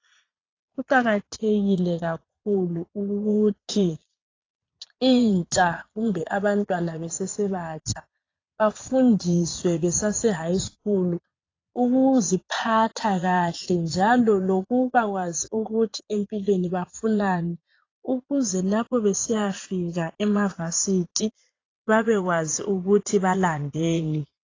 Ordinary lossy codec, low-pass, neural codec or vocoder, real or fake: AAC, 32 kbps; 7.2 kHz; vocoder, 22.05 kHz, 80 mel bands, Vocos; fake